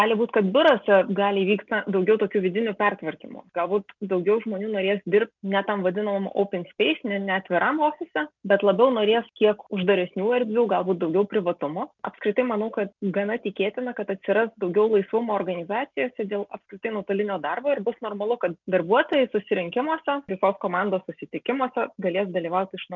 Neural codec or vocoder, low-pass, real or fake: none; 7.2 kHz; real